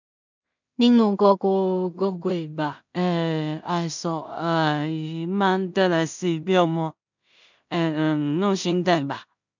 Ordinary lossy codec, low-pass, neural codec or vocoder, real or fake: none; 7.2 kHz; codec, 16 kHz in and 24 kHz out, 0.4 kbps, LongCat-Audio-Codec, two codebook decoder; fake